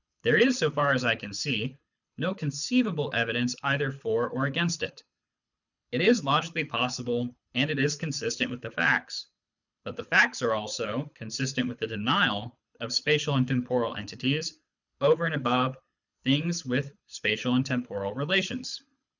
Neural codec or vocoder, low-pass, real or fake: codec, 24 kHz, 6 kbps, HILCodec; 7.2 kHz; fake